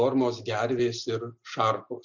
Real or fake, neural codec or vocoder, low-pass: real; none; 7.2 kHz